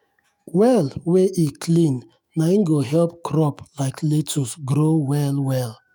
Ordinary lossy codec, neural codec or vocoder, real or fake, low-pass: none; autoencoder, 48 kHz, 128 numbers a frame, DAC-VAE, trained on Japanese speech; fake; none